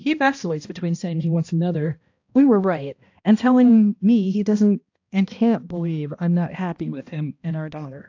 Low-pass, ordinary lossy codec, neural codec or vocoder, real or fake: 7.2 kHz; AAC, 48 kbps; codec, 16 kHz, 1 kbps, X-Codec, HuBERT features, trained on balanced general audio; fake